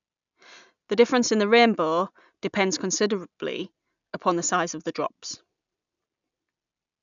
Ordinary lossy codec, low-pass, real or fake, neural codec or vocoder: none; 7.2 kHz; real; none